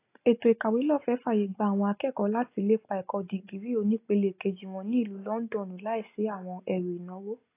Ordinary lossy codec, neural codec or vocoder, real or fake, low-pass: AAC, 24 kbps; none; real; 3.6 kHz